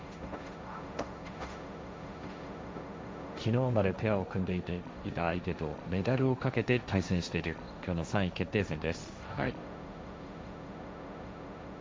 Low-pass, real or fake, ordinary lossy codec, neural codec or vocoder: none; fake; none; codec, 16 kHz, 1.1 kbps, Voila-Tokenizer